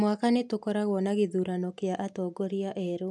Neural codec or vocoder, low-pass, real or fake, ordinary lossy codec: none; none; real; none